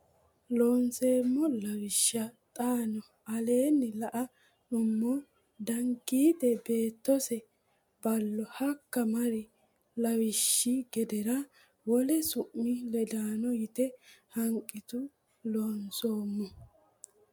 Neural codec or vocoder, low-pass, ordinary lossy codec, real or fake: none; 19.8 kHz; MP3, 96 kbps; real